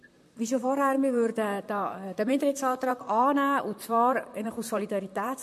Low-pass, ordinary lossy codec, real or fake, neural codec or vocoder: 14.4 kHz; MP3, 64 kbps; fake; vocoder, 44.1 kHz, 128 mel bands, Pupu-Vocoder